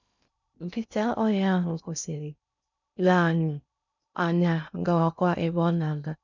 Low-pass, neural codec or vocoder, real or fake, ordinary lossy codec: 7.2 kHz; codec, 16 kHz in and 24 kHz out, 0.6 kbps, FocalCodec, streaming, 2048 codes; fake; none